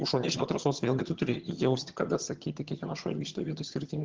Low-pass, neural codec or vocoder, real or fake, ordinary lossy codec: 7.2 kHz; vocoder, 22.05 kHz, 80 mel bands, HiFi-GAN; fake; Opus, 16 kbps